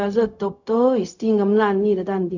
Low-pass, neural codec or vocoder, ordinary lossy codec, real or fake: 7.2 kHz; codec, 16 kHz, 0.4 kbps, LongCat-Audio-Codec; none; fake